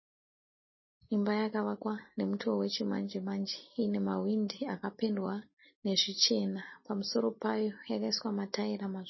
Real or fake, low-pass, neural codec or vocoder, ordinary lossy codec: real; 7.2 kHz; none; MP3, 24 kbps